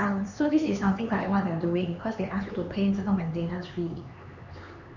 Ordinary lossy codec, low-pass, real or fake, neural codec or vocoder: none; 7.2 kHz; fake; codec, 16 kHz, 4 kbps, X-Codec, HuBERT features, trained on LibriSpeech